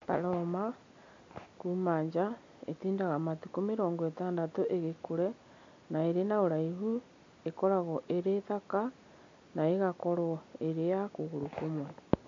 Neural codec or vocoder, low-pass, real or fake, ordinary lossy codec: none; 7.2 kHz; real; MP3, 48 kbps